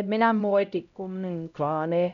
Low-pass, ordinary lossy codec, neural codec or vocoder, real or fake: 7.2 kHz; none; codec, 16 kHz, 0.5 kbps, X-Codec, HuBERT features, trained on LibriSpeech; fake